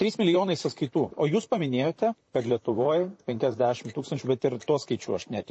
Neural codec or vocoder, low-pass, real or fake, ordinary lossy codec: vocoder, 44.1 kHz, 128 mel bands, Pupu-Vocoder; 9.9 kHz; fake; MP3, 32 kbps